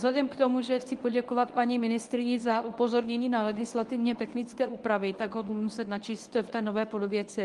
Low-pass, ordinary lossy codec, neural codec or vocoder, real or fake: 10.8 kHz; Opus, 24 kbps; codec, 24 kHz, 0.9 kbps, WavTokenizer, medium speech release version 1; fake